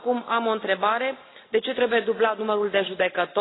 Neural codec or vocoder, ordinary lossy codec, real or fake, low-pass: none; AAC, 16 kbps; real; 7.2 kHz